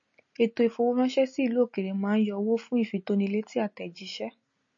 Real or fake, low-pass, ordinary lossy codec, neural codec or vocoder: real; 7.2 kHz; MP3, 32 kbps; none